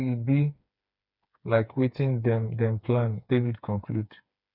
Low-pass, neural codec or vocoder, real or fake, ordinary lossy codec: 5.4 kHz; codec, 16 kHz, 4 kbps, FreqCodec, smaller model; fake; none